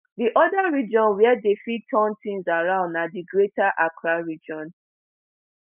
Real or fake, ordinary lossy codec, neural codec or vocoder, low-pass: real; none; none; 3.6 kHz